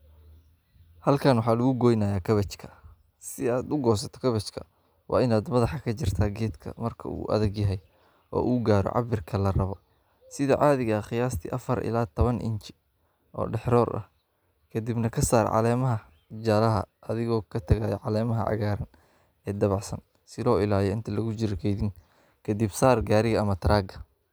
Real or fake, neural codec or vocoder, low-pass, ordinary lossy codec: real; none; none; none